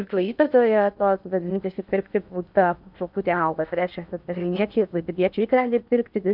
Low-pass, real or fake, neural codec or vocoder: 5.4 kHz; fake; codec, 16 kHz in and 24 kHz out, 0.6 kbps, FocalCodec, streaming, 2048 codes